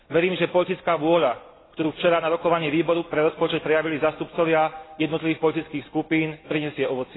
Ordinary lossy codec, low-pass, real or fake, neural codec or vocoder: AAC, 16 kbps; 7.2 kHz; real; none